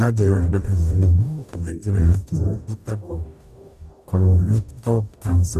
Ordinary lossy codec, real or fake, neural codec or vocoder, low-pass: none; fake; codec, 44.1 kHz, 0.9 kbps, DAC; 14.4 kHz